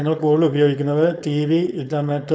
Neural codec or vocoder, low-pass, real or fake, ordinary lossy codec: codec, 16 kHz, 4.8 kbps, FACodec; none; fake; none